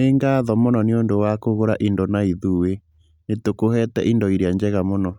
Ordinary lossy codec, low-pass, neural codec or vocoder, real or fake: none; 19.8 kHz; none; real